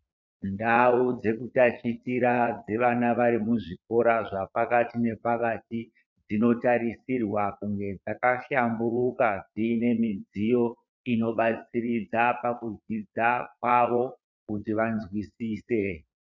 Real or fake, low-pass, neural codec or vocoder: fake; 7.2 kHz; vocoder, 22.05 kHz, 80 mel bands, Vocos